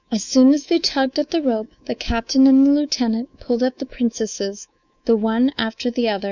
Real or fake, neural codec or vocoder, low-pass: fake; codec, 24 kHz, 3.1 kbps, DualCodec; 7.2 kHz